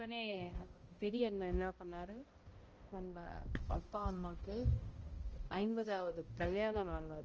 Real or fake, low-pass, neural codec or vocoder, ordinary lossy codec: fake; 7.2 kHz; codec, 16 kHz, 0.5 kbps, X-Codec, HuBERT features, trained on balanced general audio; Opus, 24 kbps